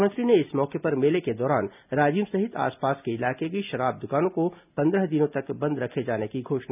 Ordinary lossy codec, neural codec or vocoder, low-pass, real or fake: none; none; 3.6 kHz; real